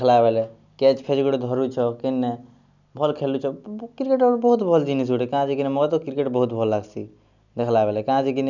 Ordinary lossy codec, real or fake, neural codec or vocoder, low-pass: none; real; none; 7.2 kHz